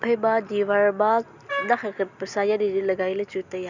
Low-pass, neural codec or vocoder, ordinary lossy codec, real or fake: 7.2 kHz; none; none; real